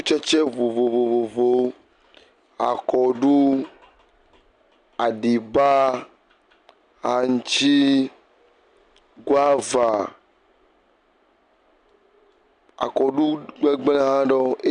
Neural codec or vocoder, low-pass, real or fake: none; 9.9 kHz; real